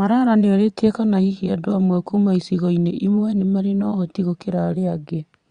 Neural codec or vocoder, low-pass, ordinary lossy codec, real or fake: vocoder, 22.05 kHz, 80 mel bands, Vocos; 9.9 kHz; Opus, 64 kbps; fake